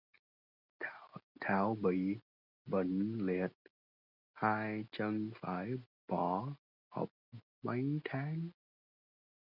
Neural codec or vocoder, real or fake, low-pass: none; real; 5.4 kHz